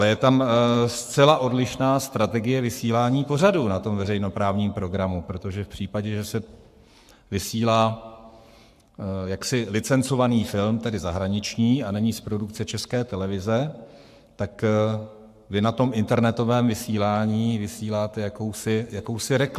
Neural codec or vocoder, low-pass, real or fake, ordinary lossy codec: codec, 44.1 kHz, 7.8 kbps, Pupu-Codec; 14.4 kHz; fake; AAC, 96 kbps